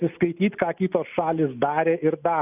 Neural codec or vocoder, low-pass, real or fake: none; 3.6 kHz; real